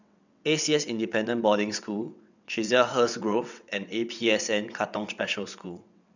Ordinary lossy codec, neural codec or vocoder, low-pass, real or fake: none; vocoder, 22.05 kHz, 80 mel bands, WaveNeXt; 7.2 kHz; fake